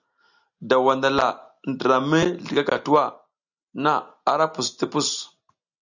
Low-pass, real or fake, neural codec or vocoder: 7.2 kHz; real; none